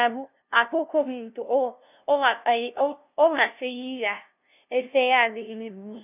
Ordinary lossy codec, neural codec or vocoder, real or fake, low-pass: none; codec, 16 kHz, 0.5 kbps, FunCodec, trained on LibriTTS, 25 frames a second; fake; 3.6 kHz